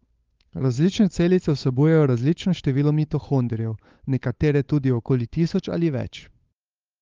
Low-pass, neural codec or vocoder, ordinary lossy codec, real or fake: 7.2 kHz; codec, 16 kHz, 8 kbps, FunCodec, trained on Chinese and English, 25 frames a second; Opus, 32 kbps; fake